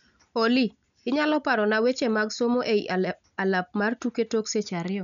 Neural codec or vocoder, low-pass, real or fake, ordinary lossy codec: none; 7.2 kHz; real; none